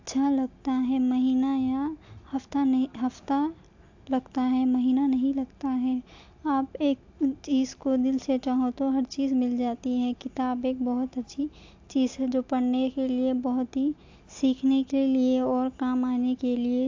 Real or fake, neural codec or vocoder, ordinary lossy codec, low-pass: real; none; AAC, 48 kbps; 7.2 kHz